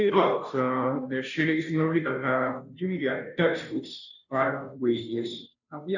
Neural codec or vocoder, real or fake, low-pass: codec, 16 kHz, 0.5 kbps, FunCodec, trained on Chinese and English, 25 frames a second; fake; 7.2 kHz